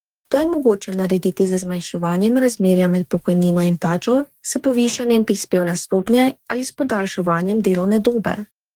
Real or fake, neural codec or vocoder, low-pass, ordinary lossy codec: fake; codec, 44.1 kHz, 2.6 kbps, DAC; 19.8 kHz; Opus, 32 kbps